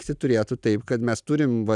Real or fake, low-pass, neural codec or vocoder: real; 10.8 kHz; none